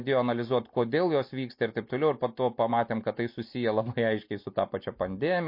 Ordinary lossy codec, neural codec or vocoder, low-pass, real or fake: MP3, 32 kbps; none; 5.4 kHz; real